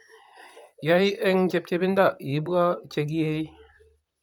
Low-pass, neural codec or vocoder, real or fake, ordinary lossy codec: 19.8 kHz; vocoder, 44.1 kHz, 128 mel bands, Pupu-Vocoder; fake; none